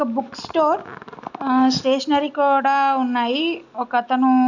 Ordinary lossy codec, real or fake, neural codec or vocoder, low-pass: none; real; none; 7.2 kHz